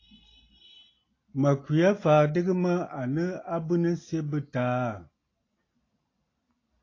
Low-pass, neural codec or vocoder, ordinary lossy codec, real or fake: 7.2 kHz; none; AAC, 32 kbps; real